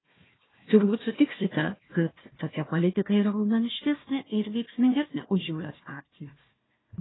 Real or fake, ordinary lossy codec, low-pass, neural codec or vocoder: fake; AAC, 16 kbps; 7.2 kHz; codec, 16 kHz, 1 kbps, FunCodec, trained on Chinese and English, 50 frames a second